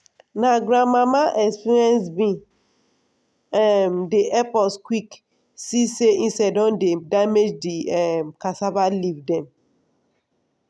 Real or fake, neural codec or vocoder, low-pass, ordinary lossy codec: real; none; none; none